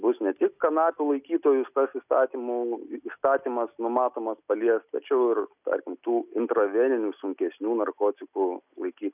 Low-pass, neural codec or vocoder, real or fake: 3.6 kHz; none; real